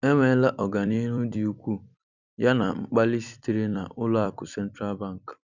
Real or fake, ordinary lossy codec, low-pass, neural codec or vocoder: fake; none; 7.2 kHz; vocoder, 44.1 kHz, 128 mel bands every 256 samples, BigVGAN v2